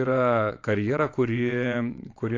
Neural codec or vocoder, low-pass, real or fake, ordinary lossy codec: vocoder, 22.05 kHz, 80 mel bands, WaveNeXt; 7.2 kHz; fake; AAC, 48 kbps